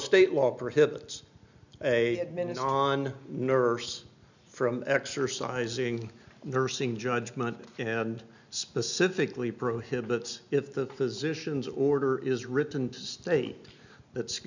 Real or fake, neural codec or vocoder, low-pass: real; none; 7.2 kHz